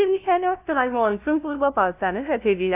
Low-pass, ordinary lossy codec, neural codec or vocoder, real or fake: 3.6 kHz; none; codec, 16 kHz, 0.5 kbps, FunCodec, trained on LibriTTS, 25 frames a second; fake